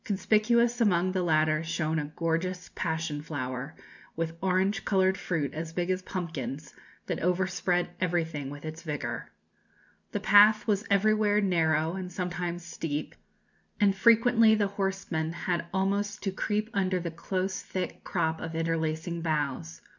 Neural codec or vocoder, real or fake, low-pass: none; real; 7.2 kHz